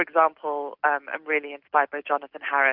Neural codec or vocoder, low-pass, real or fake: none; 5.4 kHz; real